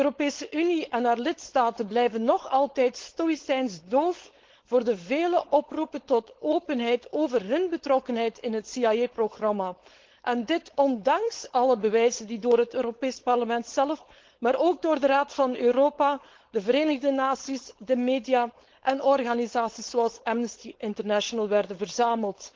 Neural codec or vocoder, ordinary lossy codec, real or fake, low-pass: codec, 16 kHz, 4.8 kbps, FACodec; Opus, 16 kbps; fake; 7.2 kHz